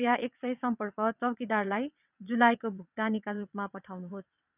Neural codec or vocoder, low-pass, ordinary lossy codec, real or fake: vocoder, 22.05 kHz, 80 mel bands, WaveNeXt; 3.6 kHz; none; fake